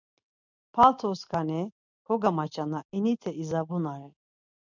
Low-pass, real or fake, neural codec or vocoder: 7.2 kHz; real; none